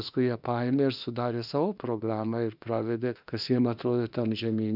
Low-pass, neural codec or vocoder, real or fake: 5.4 kHz; autoencoder, 48 kHz, 32 numbers a frame, DAC-VAE, trained on Japanese speech; fake